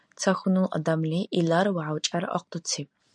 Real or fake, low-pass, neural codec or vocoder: real; 9.9 kHz; none